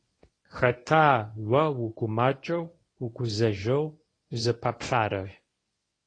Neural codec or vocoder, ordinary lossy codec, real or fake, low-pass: codec, 24 kHz, 0.9 kbps, WavTokenizer, medium speech release version 1; AAC, 32 kbps; fake; 9.9 kHz